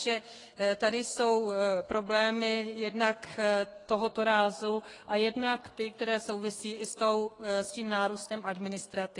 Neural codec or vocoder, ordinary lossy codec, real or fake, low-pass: codec, 32 kHz, 1.9 kbps, SNAC; AAC, 32 kbps; fake; 10.8 kHz